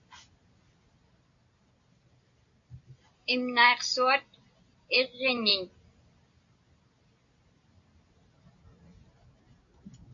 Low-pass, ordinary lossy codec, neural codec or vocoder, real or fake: 7.2 kHz; AAC, 64 kbps; none; real